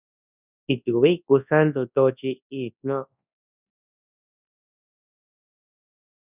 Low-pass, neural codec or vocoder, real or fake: 3.6 kHz; codec, 24 kHz, 0.9 kbps, WavTokenizer, large speech release; fake